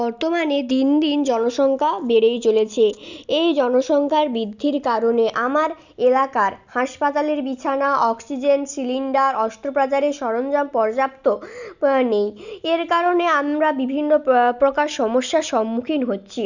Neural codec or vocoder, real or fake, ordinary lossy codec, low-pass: none; real; none; 7.2 kHz